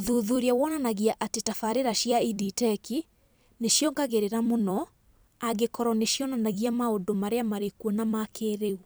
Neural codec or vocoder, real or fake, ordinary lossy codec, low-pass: vocoder, 44.1 kHz, 128 mel bands every 256 samples, BigVGAN v2; fake; none; none